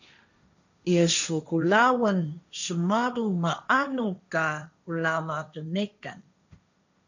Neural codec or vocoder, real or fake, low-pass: codec, 16 kHz, 1.1 kbps, Voila-Tokenizer; fake; 7.2 kHz